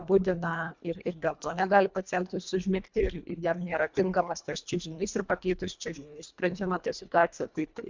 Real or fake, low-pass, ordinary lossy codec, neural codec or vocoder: fake; 7.2 kHz; MP3, 64 kbps; codec, 24 kHz, 1.5 kbps, HILCodec